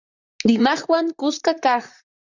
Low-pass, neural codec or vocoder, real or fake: 7.2 kHz; vocoder, 44.1 kHz, 128 mel bands, Pupu-Vocoder; fake